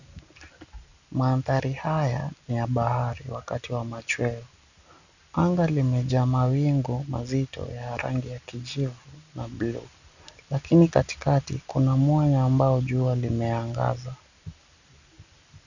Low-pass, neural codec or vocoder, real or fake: 7.2 kHz; none; real